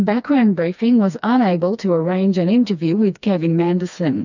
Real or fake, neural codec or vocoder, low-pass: fake; codec, 16 kHz, 2 kbps, FreqCodec, smaller model; 7.2 kHz